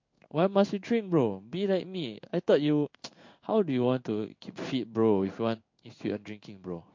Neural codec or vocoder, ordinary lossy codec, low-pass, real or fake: codec, 16 kHz in and 24 kHz out, 1 kbps, XY-Tokenizer; MP3, 48 kbps; 7.2 kHz; fake